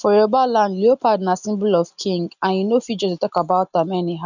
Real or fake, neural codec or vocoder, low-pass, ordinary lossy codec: real; none; 7.2 kHz; none